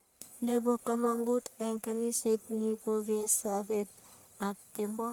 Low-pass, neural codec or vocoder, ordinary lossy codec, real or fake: none; codec, 44.1 kHz, 1.7 kbps, Pupu-Codec; none; fake